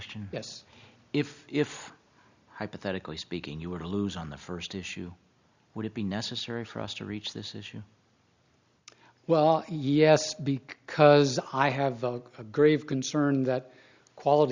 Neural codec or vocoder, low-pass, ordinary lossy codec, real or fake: none; 7.2 kHz; Opus, 64 kbps; real